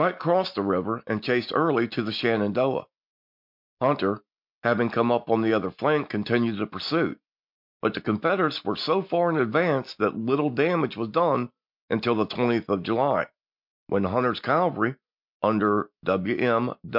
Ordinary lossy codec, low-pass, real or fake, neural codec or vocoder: MP3, 48 kbps; 5.4 kHz; real; none